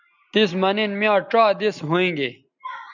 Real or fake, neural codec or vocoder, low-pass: real; none; 7.2 kHz